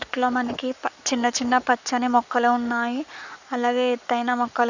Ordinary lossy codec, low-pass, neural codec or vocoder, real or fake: none; 7.2 kHz; codec, 44.1 kHz, 7.8 kbps, Pupu-Codec; fake